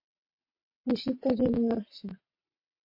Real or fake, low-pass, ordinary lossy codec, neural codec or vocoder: fake; 5.4 kHz; MP3, 32 kbps; vocoder, 22.05 kHz, 80 mel bands, WaveNeXt